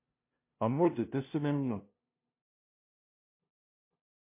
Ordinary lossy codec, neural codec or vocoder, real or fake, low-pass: MP3, 24 kbps; codec, 16 kHz, 0.5 kbps, FunCodec, trained on LibriTTS, 25 frames a second; fake; 3.6 kHz